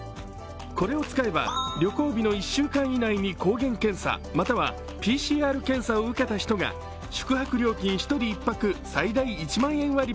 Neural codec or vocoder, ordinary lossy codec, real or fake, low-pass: none; none; real; none